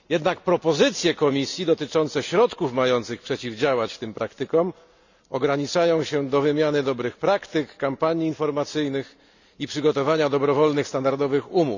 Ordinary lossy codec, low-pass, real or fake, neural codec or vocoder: none; 7.2 kHz; real; none